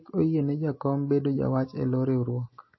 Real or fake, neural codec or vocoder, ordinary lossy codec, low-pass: real; none; MP3, 24 kbps; 7.2 kHz